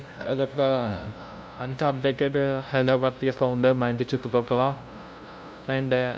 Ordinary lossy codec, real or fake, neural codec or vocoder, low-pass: none; fake; codec, 16 kHz, 0.5 kbps, FunCodec, trained on LibriTTS, 25 frames a second; none